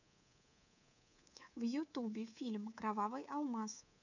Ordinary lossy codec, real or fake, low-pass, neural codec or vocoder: none; fake; 7.2 kHz; codec, 24 kHz, 3.1 kbps, DualCodec